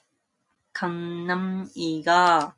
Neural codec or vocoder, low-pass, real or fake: none; 10.8 kHz; real